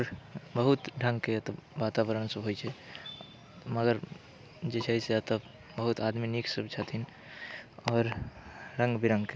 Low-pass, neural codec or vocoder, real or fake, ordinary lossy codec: none; none; real; none